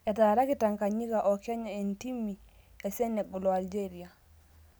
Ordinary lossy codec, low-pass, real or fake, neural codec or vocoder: none; none; real; none